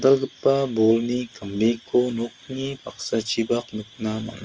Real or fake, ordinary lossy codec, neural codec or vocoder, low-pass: real; Opus, 24 kbps; none; 7.2 kHz